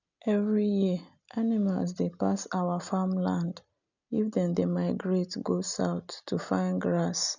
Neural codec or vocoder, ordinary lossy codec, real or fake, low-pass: none; none; real; 7.2 kHz